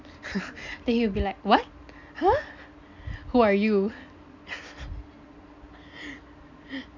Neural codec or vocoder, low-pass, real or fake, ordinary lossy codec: none; 7.2 kHz; real; none